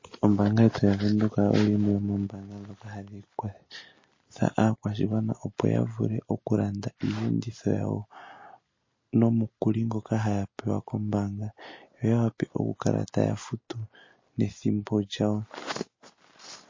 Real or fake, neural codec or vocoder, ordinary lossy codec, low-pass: real; none; MP3, 32 kbps; 7.2 kHz